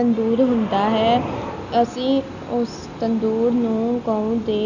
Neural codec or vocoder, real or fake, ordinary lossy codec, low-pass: none; real; none; 7.2 kHz